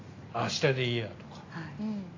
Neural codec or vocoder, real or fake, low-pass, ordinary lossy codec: none; real; 7.2 kHz; AAC, 32 kbps